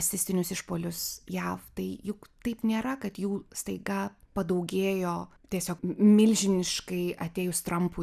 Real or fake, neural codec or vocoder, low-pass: real; none; 14.4 kHz